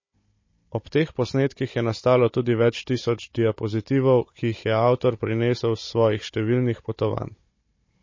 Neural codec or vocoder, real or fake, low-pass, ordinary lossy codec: codec, 16 kHz, 16 kbps, FunCodec, trained on Chinese and English, 50 frames a second; fake; 7.2 kHz; MP3, 32 kbps